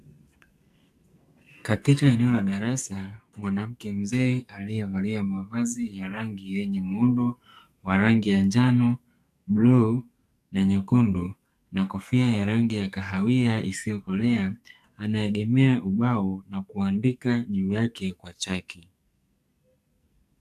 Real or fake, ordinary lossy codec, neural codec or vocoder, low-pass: fake; AAC, 96 kbps; codec, 44.1 kHz, 2.6 kbps, SNAC; 14.4 kHz